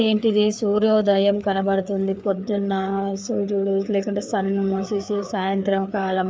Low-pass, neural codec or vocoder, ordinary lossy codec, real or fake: none; codec, 16 kHz, 4 kbps, FreqCodec, larger model; none; fake